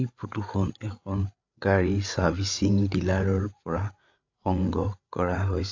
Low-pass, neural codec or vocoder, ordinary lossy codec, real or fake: 7.2 kHz; codec, 16 kHz, 8 kbps, FreqCodec, larger model; AAC, 48 kbps; fake